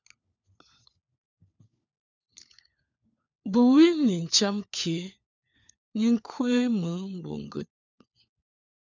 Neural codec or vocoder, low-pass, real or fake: codec, 16 kHz, 4 kbps, FunCodec, trained on LibriTTS, 50 frames a second; 7.2 kHz; fake